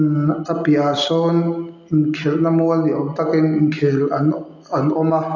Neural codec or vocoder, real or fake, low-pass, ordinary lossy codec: none; real; 7.2 kHz; none